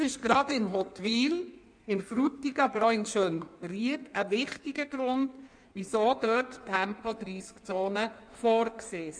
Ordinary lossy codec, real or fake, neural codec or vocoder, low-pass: none; fake; codec, 16 kHz in and 24 kHz out, 1.1 kbps, FireRedTTS-2 codec; 9.9 kHz